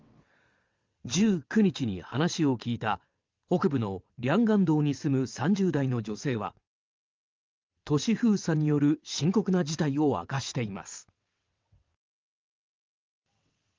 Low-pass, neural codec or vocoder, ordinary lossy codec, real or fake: 7.2 kHz; vocoder, 22.05 kHz, 80 mel bands, WaveNeXt; Opus, 32 kbps; fake